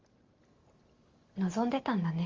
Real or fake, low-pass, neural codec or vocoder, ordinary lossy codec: real; 7.2 kHz; none; Opus, 32 kbps